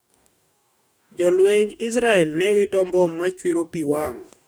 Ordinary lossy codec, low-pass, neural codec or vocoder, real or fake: none; none; codec, 44.1 kHz, 2.6 kbps, DAC; fake